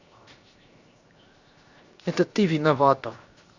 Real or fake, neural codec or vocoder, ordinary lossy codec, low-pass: fake; codec, 16 kHz, 0.7 kbps, FocalCodec; Opus, 64 kbps; 7.2 kHz